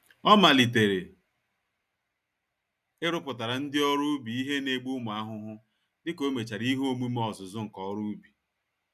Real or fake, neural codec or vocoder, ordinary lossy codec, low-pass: real; none; none; 14.4 kHz